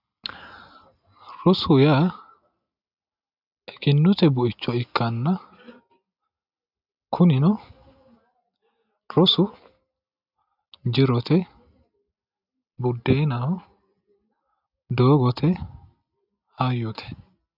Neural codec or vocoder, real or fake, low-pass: none; real; 5.4 kHz